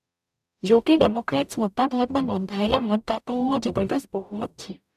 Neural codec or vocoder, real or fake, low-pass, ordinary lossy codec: codec, 44.1 kHz, 0.9 kbps, DAC; fake; 14.4 kHz; none